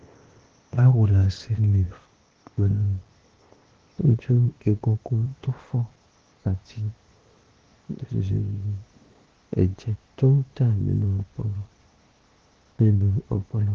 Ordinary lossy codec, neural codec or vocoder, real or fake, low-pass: Opus, 16 kbps; codec, 16 kHz, 0.8 kbps, ZipCodec; fake; 7.2 kHz